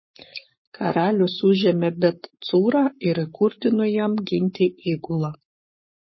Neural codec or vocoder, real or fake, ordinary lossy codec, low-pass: codec, 44.1 kHz, 7.8 kbps, Pupu-Codec; fake; MP3, 24 kbps; 7.2 kHz